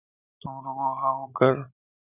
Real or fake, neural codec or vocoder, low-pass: real; none; 3.6 kHz